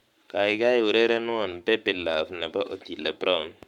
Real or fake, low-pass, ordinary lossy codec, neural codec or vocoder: fake; 19.8 kHz; none; codec, 44.1 kHz, 7.8 kbps, Pupu-Codec